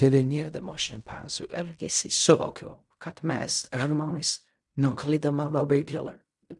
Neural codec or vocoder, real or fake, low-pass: codec, 16 kHz in and 24 kHz out, 0.4 kbps, LongCat-Audio-Codec, fine tuned four codebook decoder; fake; 10.8 kHz